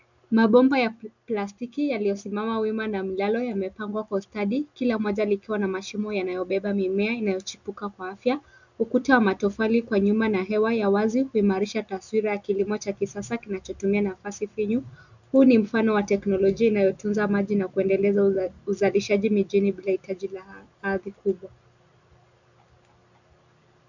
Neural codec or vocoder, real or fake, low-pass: none; real; 7.2 kHz